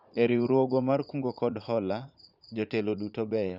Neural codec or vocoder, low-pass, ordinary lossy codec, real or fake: vocoder, 44.1 kHz, 80 mel bands, Vocos; 5.4 kHz; none; fake